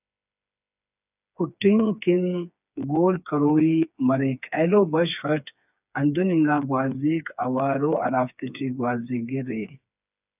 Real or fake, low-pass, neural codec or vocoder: fake; 3.6 kHz; codec, 16 kHz, 4 kbps, FreqCodec, smaller model